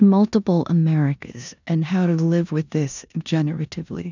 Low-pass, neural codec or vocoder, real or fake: 7.2 kHz; codec, 16 kHz in and 24 kHz out, 0.9 kbps, LongCat-Audio-Codec, four codebook decoder; fake